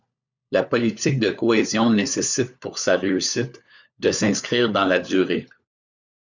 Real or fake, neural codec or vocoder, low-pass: fake; codec, 16 kHz, 4 kbps, FunCodec, trained on LibriTTS, 50 frames a second; 7.2 kHz